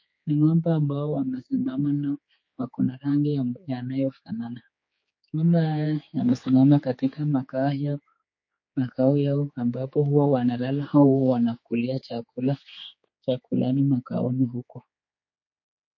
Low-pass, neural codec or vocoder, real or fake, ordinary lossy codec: 7.2 kHz; codec, 16 kHz, 4 kbps, X-Codec, HuBERT features, trained on general audio; fake; MP3, 32 kbps